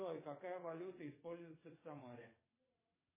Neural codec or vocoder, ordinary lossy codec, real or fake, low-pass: vocoder, 22.05 kHz, 80 mel bands, WaveNeXt; MP3, 16 kbps; fake; 3.6 kHz